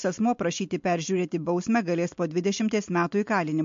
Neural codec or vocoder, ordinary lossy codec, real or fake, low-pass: none; MP3, 48 kbps; real; 7.2 kHz